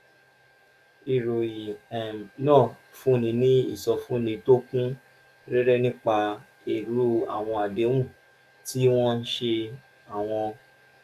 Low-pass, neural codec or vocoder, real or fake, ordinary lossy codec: 14.4 kHz; codec, 44.1 kHz, 7.8 kbps, DAC; fake; none